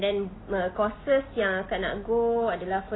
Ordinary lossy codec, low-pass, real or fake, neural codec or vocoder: AAC, 16 kbps; 7.2 kHz; real; none